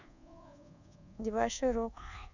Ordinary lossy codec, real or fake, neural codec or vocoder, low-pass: none; fake; codec, 24 kHz, 1.2 kbps, DualCodec; 7.2 kHz